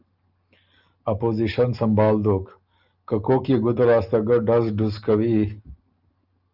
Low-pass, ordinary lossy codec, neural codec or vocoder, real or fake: 5.4 kHz; Opus, 24 kbps; none; real